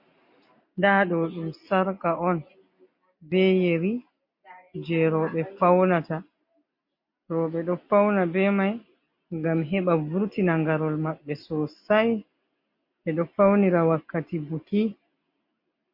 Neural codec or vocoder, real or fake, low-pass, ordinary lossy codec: none; real; 5.4 kHz; MP3, 32 kbps